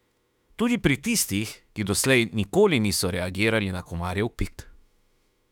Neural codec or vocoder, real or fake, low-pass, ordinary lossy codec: autoencoder, 48 kHz, 32 numbers a frame, DAC-VAE, trained on Japanese speech; fake; 19.8 kHz; none